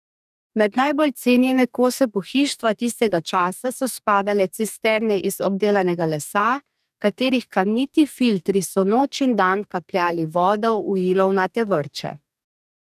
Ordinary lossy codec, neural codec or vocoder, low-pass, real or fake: none; codec, 44.1 kHz, 2.6 kbps, DAC; 14.4 kHz; fake